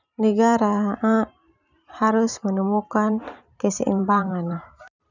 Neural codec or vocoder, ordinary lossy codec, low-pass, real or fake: vocoder, 44.1 kHz, 128 mel bands every 512 samples, BigVGAN v2; none; 7.2 kHz; fake